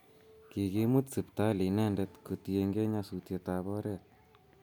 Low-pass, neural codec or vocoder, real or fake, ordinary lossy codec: none; none; real; none